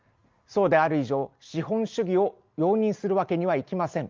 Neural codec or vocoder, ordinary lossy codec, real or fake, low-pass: none; Opus, 32 kbps; real; 7.2 kHz